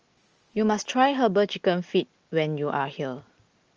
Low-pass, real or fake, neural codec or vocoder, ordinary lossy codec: 7.2 kHz; real; none; Opus, 24 kbps